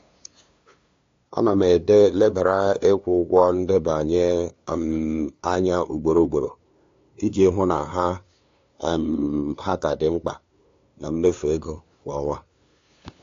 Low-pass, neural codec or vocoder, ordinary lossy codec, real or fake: 7.2 kHz; codec, 16 kHz, 2 kbps, FunCodec, trained on LibriTTS, 25 frames a second; AAC, 48 kbps; fake